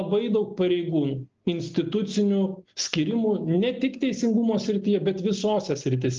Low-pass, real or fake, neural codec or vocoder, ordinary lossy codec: 7.2 kHz; real; none; Opus, 24 kbps